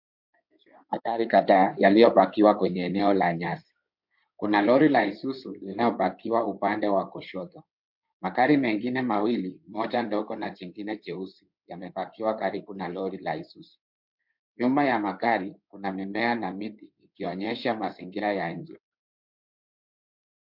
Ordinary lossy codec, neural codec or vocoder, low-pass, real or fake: MP3, 48 kbps; codec, 16 kHz in and 24 kHz out, 2.2 kbps, FireRedTTS-2 codec; 5.4 kHz; fake